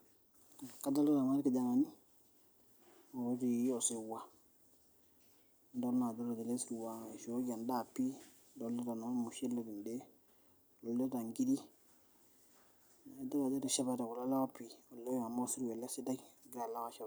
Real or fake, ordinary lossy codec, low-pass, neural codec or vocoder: real; none; none; none